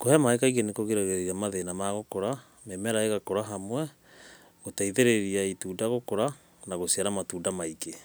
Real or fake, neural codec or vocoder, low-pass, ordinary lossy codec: real; none; none; none